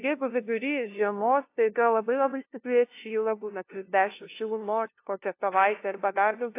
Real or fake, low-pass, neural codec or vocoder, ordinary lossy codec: fake; 3.6 kHz; codec, 16 kHz, 0.5 kbps, FunCodec, trained on LibriTTS, 25 frames a second; AAC, 24 kbps